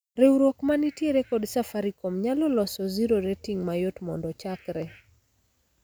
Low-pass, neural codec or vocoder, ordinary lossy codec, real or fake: none; none; none; real